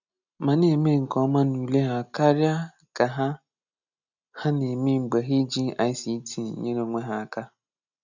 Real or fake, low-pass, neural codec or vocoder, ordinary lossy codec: real; 7.2 kHz; none; none